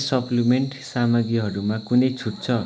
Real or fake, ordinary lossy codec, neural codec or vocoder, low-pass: real; none; none; none